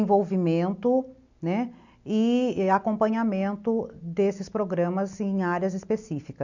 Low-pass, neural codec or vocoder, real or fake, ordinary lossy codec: 7.2 kHz; none; real; none